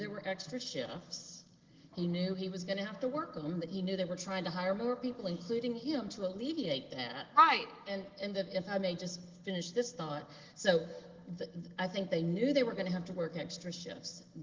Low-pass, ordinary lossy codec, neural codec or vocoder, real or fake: 7.2 kHz; Opus, 16 kbps; none; real